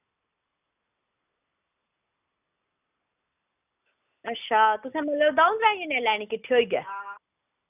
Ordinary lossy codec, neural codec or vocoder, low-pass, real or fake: none; none; 3.6 kHz; real